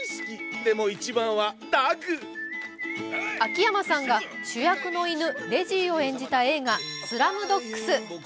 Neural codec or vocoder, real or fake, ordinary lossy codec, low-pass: none; real; none; none